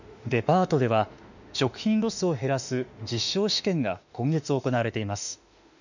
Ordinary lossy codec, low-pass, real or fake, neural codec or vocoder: none; 7.2 kHz; fake; autoencoder, 48 kHz, 32 numbers a frame, DAC-VAE, trained on Japanese speech